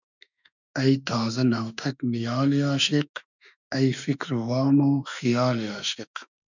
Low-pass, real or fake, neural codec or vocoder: 7.2 kHz; fake; autoencoder, 48 kHz, 32 numbers a frame, DAC-VAE, trained on Japanese speech